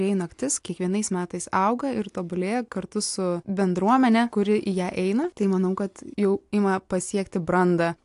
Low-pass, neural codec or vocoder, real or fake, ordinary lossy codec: 10.8 kHz; none; real; AAC, 64 kbps